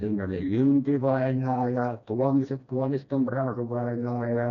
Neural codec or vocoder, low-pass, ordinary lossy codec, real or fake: codec, 16 kHz, 1 kbps, FreqCodec, smaller model; 7.2 kHz; none; fake